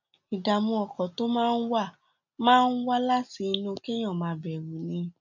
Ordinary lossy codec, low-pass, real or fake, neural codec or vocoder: none; 7.2 kHz; real; none